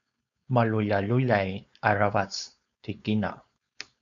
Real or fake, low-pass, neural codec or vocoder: fake; 7.2 kHz; codec, 16 kHz, 4.8 kbps, FACodec